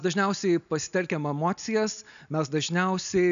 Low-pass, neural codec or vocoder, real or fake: 7.2 kHz; none; real